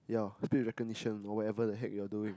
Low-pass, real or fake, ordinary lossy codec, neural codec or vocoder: none; real; none; none